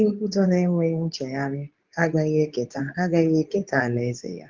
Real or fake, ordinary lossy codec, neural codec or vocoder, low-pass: fake; Opus, 32 kbps; codec, 24 kHz, 0.9 kbps, WavTokenizer, medium speech release version 2; 7.2 kHz